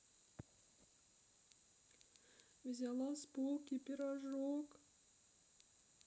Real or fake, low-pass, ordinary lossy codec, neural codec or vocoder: real; none; none; none